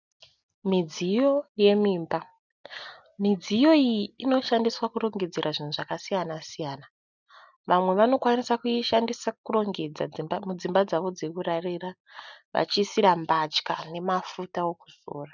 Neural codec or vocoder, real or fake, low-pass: none; real; 7.2 kHz